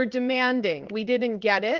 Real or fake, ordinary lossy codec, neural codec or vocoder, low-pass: fake; Opus, 32 kbps; codec, 16 kHz in and 24 kHz out, 1 kbps, XY-Tokenizer; 7.2 kHz